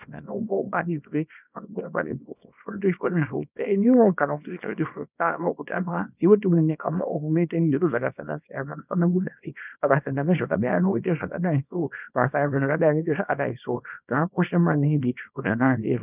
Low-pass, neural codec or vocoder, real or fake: 3.6 kHz; codec, 24 kHz, 0.9 kbps, WavTokenizer, small release; fake